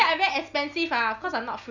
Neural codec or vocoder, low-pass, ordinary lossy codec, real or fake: none; 7.2 kHz; none; real